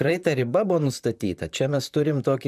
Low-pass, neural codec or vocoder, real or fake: 14.4 kHz; vocoder, 44.1 kHz, 128 mel bands, Pupu-Vocoder; fake